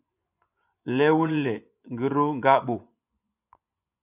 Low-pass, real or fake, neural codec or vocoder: 3.6 kHz; fake; vocoder, 24 kHz, 100 mel bands, Vocos